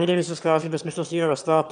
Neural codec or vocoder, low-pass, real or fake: autoencoder, 22.05 kHz, a latent of 192 numbers a frame, VITS, trained on one speaker; 9.9 kHz; fake